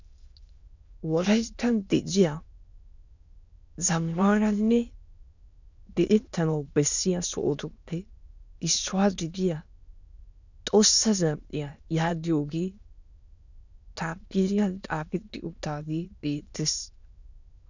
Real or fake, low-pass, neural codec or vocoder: fake; 7.2 kHz; autoencoder, 22.05 kHz, a latent of 192 numbers a frame, VITS, trained on many speakers